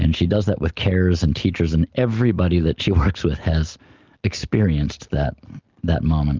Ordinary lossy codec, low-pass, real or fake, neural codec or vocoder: Opus, 24 kbps; 7.2 kHz; real; none